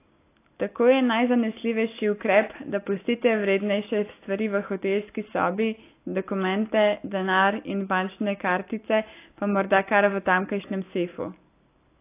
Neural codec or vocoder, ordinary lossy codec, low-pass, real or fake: none; AAC, 24 kbps; 3.6 kHz; real